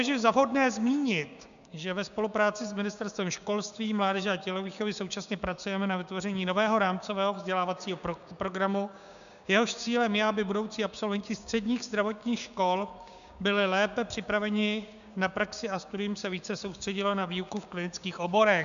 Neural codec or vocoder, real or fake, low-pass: codec, 16 kHz, 6 kbps, DAC; fake; 7.2 kHz